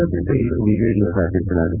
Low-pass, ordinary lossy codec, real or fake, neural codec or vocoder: 3.6 kHz; none; fake; vocoder, 22.05 kHz, 80 mel bands, WaveNeXt